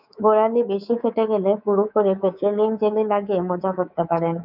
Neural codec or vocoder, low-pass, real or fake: codec, 24 kHz, 3.1 kbps, DualCodec; 5.4 kHz; fake